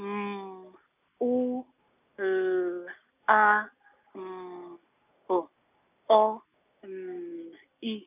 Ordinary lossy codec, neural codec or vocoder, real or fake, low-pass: none; none; real; 3.6 kHz